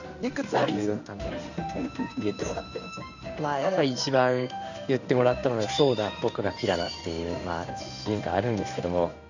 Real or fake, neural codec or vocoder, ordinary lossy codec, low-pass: fake; codec, 16 kHz in and 24 kHz out, 1 kbps, XY-Tokenizer; none; 7.2 kHz